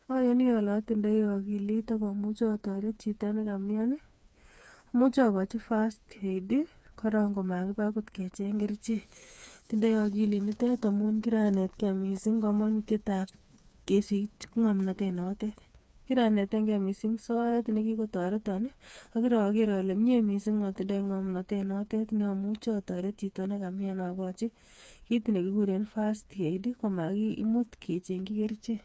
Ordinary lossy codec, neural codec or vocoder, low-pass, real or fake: none; codec, 16 kHz, 4 kbps, FreqCodec, smaller model; none; fake